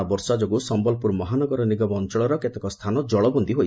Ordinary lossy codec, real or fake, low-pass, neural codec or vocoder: none; real; none; none